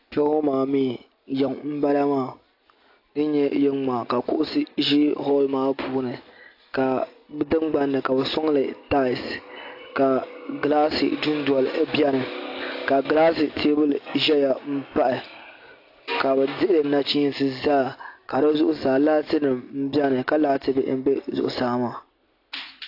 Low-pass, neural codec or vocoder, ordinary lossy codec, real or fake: 5.4 kHz; none; AAC, 32 kbps; real